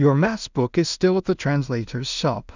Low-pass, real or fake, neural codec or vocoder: 7.2 kHz; fake; codec, 16 kHz in and 24 kHz out, 0.4 kbps, LongCat-Audio-Codec, two codebook decoder